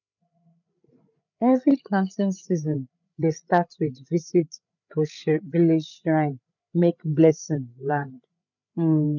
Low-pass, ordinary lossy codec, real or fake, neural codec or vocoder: 7.2 kHz; none; fake; codec, 16 kHz, 8 kbps, FreqCodec, larger model